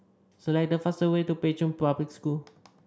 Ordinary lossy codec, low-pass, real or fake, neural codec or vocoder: none; none; real; none